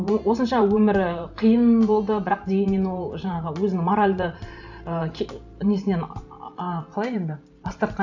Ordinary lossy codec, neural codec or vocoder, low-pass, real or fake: none; vocoder, 44.1 kHz, 128 mel bands every 512 samples, BigVGAN v2; 7.2 kHz; fake